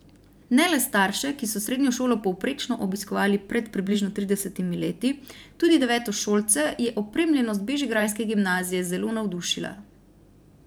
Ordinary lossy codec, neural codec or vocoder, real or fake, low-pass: none; vocoder, 44.1 kHz, 128 mel bands every 512 samples, BigVGAN v2; fake; none